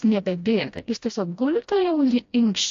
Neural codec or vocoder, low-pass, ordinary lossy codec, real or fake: codec, 16 kHz, 1 kbps, FreqCodec, smaller model; 7.2 kHz; AAC, 48 kbps; fake